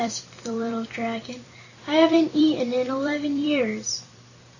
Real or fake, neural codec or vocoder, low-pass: fake; vocoder, 44.1 kHz, 128 mel bands every 256 samples, BigVGAN v2; 7.2 kHz